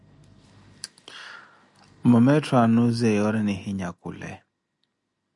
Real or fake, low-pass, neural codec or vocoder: real; 10.8 kHz; none